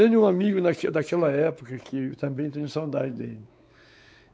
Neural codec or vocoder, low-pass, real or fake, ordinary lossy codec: codec, 16 kHz, 4 kbps, X-Codec, WavLM features, trained on Multilingual LibriSpeech; none; fake; none